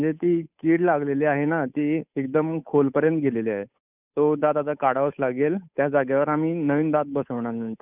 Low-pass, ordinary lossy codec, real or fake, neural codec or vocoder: 3.6 kHz; none; fake; codec, 16 kHz, 8 kbps, FunCodec, trained on Chinese and English, 25 frames a second